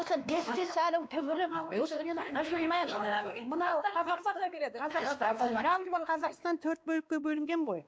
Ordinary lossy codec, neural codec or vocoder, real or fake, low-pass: none; codec, 16 kHz, 2 kbps, X-Codec, WavLM features, trained on Multilingual LibriSpeech; fake; none